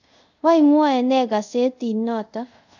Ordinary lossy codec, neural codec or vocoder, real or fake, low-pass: none; codec, 24 kHz, 0.5 kbps, DualCodec; fake; 7.2 kHz